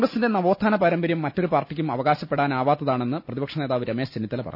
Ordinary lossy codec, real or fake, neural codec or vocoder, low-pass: none; real; none; 5.4 kHz